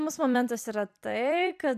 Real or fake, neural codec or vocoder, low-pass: fake; vocoder, 44.1 kHz, 128 mel bands every 256 samples, BigVGAN v2; 14.4 kHz